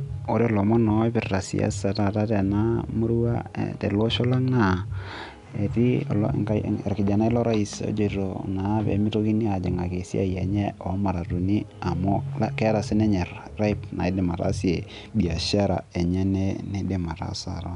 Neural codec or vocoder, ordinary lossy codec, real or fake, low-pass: none; none; real; 10.8 kHz